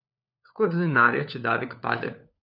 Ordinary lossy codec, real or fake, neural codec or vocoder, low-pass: none; fake; codec, 16 kHz, 4 kbps, FunCodec, trained on LibriTTS, 50 frames a second; 5.4 kHz